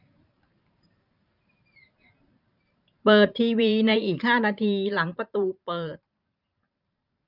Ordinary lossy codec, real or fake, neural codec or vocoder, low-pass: none; fake; vocoder, 44.1 kHz, 128 mel bands every 512 samples, BigVGAN v2; 5.4 kHz